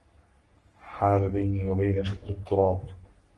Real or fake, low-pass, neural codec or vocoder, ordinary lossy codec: fake; 10.8 kHz; codec, 44.1 kHz, 3.4 kbps, Pupu-Codec; Opus, 24 kbps